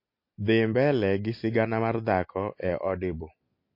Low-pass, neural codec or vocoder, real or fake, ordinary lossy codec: 5.4 kHz; none; real; MP3, 32 kbps